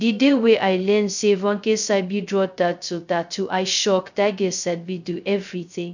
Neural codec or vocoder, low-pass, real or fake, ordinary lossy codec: codec, 16 kHz, 0.2 kbps, FocalCodec; 7.2 kHz; fake; none